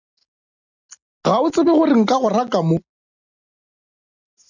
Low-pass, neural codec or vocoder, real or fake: 7.2 kHz; none; real